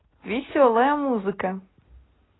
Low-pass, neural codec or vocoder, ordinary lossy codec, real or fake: 7.2 kHz; none; AAC, 16 kbps; real